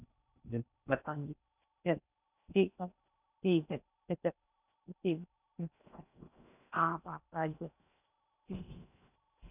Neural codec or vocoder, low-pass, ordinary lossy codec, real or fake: codec, 16 kHz in and 24 kHz out, 0.8 kbps, FocalCodec, streaming, 65536 codes; 3.6 kHz; none; fake